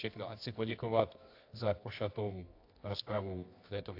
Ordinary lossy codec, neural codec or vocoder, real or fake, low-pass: AAC, 32 kbps; codec, 24 kHz, 0.9 kbps, WavTokenizer, medium music audio release; fake; 5.4 kHz